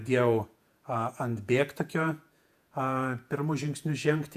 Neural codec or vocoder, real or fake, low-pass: vocoder, 48 kHz, 128 mel bands, Vocos; fake; 14.4 kHz